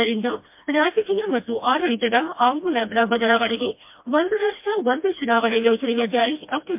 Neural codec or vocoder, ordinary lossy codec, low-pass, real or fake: codec, 16 kHz, 1 kbps, FreqCodec, smaller model; MP3, 32 kbps; 3.6 kHz; fake